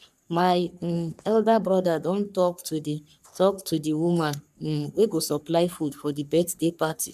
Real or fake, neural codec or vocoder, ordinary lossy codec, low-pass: fake; codec, 44.1 kHz, 3.4 kbps, Pupu-Codec; none; 14.4 kHz